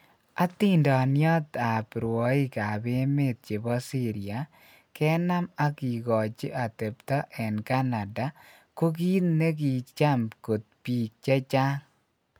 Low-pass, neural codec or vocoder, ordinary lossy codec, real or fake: none; none; none; real